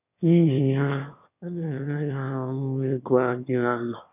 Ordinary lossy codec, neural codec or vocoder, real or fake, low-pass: none; autoencoder, 22.05 kHz, a latent of 192 numbers a frame, VITS, trained on one speaker; fake; 3.6 kHz